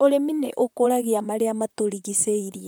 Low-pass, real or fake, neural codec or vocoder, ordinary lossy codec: none; fake; vocoder, 44.1 kHz, 128 mel bands, Pupu-Vocoder; none